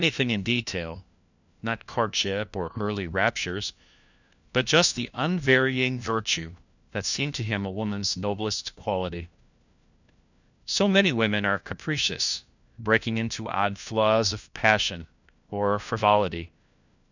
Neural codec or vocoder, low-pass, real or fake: codec, 16 kHz, 1 kbps, FunCodec, trained on LibriTTS, 50 frames a second; 7.2 kHz; fake